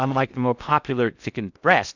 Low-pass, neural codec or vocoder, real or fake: 7.2 kHz; codec, 16 kHz in and 24 kHz out, 0.6 kbps, FocalCodec, streaming, 2048 codes; fake